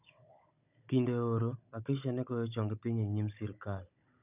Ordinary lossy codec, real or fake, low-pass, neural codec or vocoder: none; fake; 3.6 kHz; codec, 16 kHz, 16 kbps, FunCodec, trained on Chinese and English, 50 frames a second